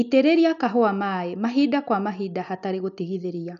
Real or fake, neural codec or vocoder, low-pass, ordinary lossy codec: real; none; 7.2 kHz; none